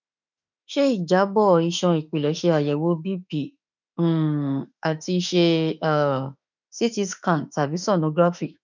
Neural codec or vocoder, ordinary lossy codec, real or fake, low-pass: autoencoder, 48 kHz, 32 numbers a frame, DAC-VAE, trained on Japanese speech; none; fake; 7.2 kHz